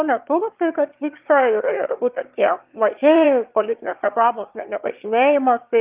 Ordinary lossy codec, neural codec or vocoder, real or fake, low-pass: Opus, 32 kbps; autoencoder, 22.05 kHz, a latent of 192 numbers a frame, VITS, trained on one speaker; fake; 3.6 kHz